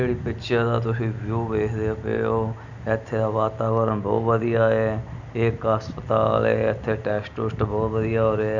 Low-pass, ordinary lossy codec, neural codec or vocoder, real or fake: 7.2 kHz; none; none; real